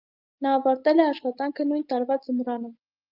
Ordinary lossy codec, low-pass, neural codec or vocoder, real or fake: Opus, 24 kbps; 5.4 kHz; none; real